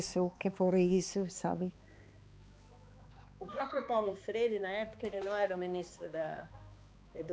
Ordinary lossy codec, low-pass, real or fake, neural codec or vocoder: none; none; fake; codec, 16 kHz, 2 kbps, X-Codec, HuBERT features, trained on balanced general audio